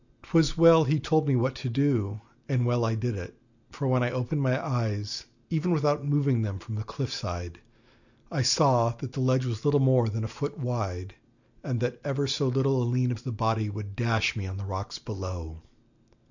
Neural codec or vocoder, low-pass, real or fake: none; 7.2 kHz; real